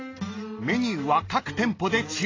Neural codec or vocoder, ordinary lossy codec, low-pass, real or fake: none; AAC, 32 kbps; 7.2 kHz; real